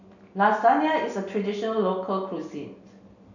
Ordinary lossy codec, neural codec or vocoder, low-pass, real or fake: none; none; 7.2 kHz; real